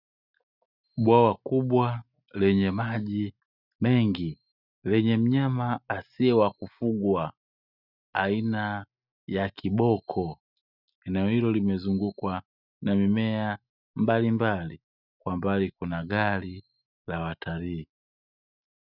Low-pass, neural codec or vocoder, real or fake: 5.4 kHz; none; real